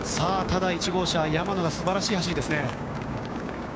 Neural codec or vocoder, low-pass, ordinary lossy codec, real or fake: codec, 16 kHz, 6 kbps, DAC; none; none; fake